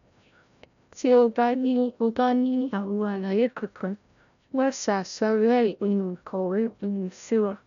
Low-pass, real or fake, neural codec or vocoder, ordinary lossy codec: 7.2 kHz; fake; codec, 16 kHz, 0.5 kbps, FreqCodec, larger model; none